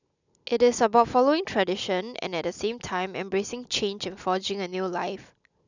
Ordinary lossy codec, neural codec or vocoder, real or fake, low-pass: none; none; real; 7.2 kHz